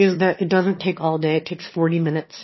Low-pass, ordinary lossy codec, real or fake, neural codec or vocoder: 7.2 kHz; MP3, 24 kbps; fake; autoencoder, 22.05 kHz, a latent of 192 numbers a frame, VITS, trained on one speaker